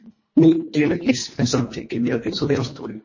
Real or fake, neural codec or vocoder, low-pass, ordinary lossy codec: fake; codec, 24 kHz, 1.5 kbps, HILCodec; 7.2 kHz; MP3, 32 kbps